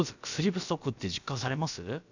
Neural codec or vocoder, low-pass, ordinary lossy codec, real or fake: codec, 16 kHz, about 1 kbps, DyCAST, with the encoder's durations; 7.2 kHz; none; fake